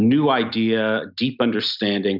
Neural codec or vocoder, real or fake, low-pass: none; real; 5.4 kHz